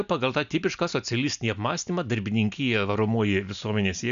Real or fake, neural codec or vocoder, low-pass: real; none; 7.2 kHz